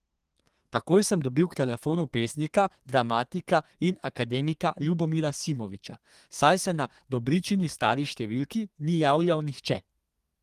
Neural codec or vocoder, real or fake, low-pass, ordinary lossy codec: codec, 32 kHz, 1.9 kbps, SNAC; fake; 14.4 kHz; Opus, 24 kbps